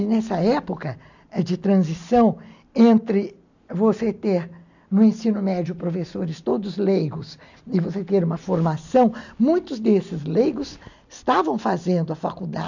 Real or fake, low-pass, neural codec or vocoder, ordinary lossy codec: real; 7.2 kHz; none; none